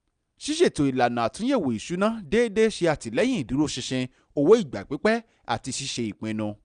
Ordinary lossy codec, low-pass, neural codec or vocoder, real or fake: none; 9.9 kHz; none; real